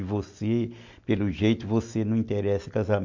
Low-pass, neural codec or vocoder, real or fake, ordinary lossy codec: 7.2 kHz; none; real; MP3, 48 kbps